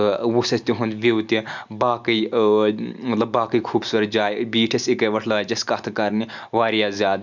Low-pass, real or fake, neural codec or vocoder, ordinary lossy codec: 7.2 kHz; real; none; none